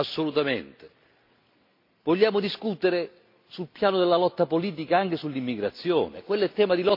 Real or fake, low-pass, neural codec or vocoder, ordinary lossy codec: real; 5.4 kHz; none; MP3, 32 kbps